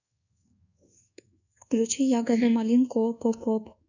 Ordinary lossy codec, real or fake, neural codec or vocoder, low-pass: none; fake; codec, 24 kHz, 1.2 kbps, DualCodec; 7.2 kHz